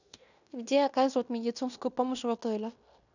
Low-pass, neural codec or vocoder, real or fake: 7.2 kHz; codec, 16 kHz in and 24 kHz out, 0.9 kbps, LongCat-Audio-Codec, fine tuned four codebook decoder; fake